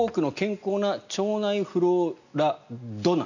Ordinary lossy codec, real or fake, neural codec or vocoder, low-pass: none; real; none; 7.2 kHz